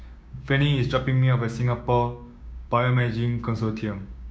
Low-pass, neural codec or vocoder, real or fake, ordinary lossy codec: none; codec, 16 kHz, 6 kbps, DAC; fake; none